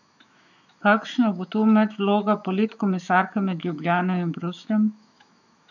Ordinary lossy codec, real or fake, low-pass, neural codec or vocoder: none; fake; 7.2 kHz; autoencoder, 48 kHz, 128 numbers a frame, DAC-VAE, trained on Japanese speech